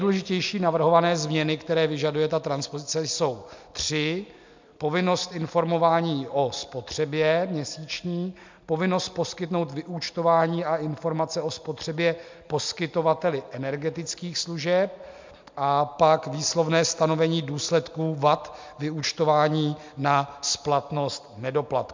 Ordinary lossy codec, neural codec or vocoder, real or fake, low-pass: MP3, 64 kbps; none; real; 7.2 kHz